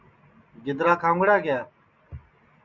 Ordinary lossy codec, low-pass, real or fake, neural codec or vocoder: Opus, 64 kbps; 7.2 kHz; real; none